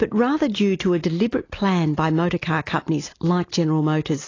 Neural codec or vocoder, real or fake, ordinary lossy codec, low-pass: none; real; AAC, 32 kbps; 7.2 kHz